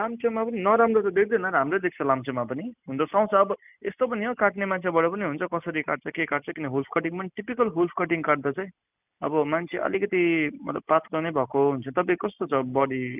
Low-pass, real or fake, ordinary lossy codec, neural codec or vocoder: 3.6 kHz; real; none; none